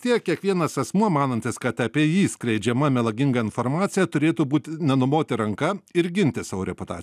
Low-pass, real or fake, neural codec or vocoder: 14.4 kHz; real; none